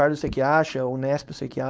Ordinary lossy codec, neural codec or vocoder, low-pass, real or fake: none; codec, 16 kHz, 4.8 kbps, FACodec; none; fake